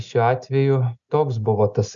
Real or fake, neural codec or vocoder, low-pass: real; none; 7.2 kHz